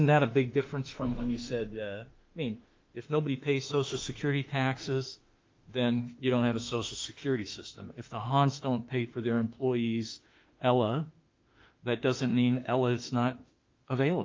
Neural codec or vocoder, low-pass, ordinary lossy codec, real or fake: autoencoder, 48 kHz, 32 numbers a frame, DAC-VAE, trained on Japanese speech; 7.2 kHz; Opus, 24 kbps; fake